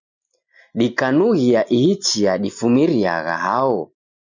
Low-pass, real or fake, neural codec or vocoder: 7.2 kHz; real; none